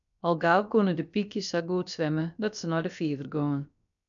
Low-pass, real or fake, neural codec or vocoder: 7.2 kHz; fake; codec, 16 kHz, about 1 kbps, DyCAST, with the encoder's durations